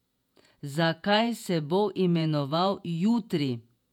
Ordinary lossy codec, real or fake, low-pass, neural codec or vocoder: none; fake; 19.8 kHz; vocoder, 48 kHz, 128 mel bands, Vocos